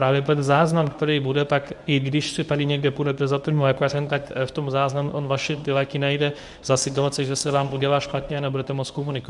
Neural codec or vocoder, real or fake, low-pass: codec, 24 kHz, 0.9 kbps, WavTokenizer, medium speech release version 1; fake; 10.8 kHz